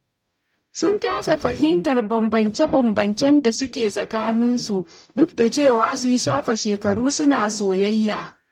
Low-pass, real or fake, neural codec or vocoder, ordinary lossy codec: 19.8 kHz; fake; codec, 44.1 kHz, 0.9 kbps, DAC; MP3, 96 kbps